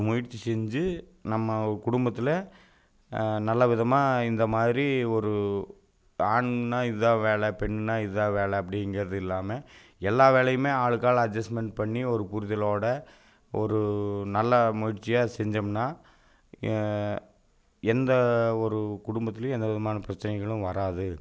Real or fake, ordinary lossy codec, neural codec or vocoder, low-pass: real; none; none; none